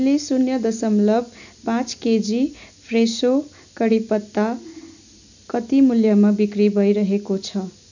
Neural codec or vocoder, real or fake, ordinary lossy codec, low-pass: none; real; none; 7.2 kHz